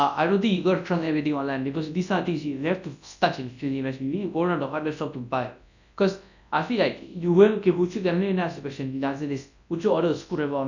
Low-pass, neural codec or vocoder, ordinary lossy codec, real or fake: 7.2 kHz; codec, 24 kHz, 0.9 kbps, WavTokenizer, large speech release; none; fake